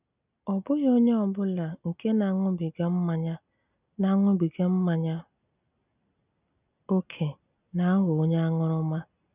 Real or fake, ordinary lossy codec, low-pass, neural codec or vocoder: real; none; 3.6 kHz; none